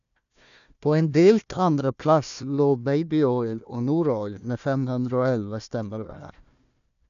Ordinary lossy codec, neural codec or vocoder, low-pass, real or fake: none; codec, 16 kHz, 1 kbps, FunCodec, trained on Chinese and English, 50 frames a second; 7.2 kHz; fake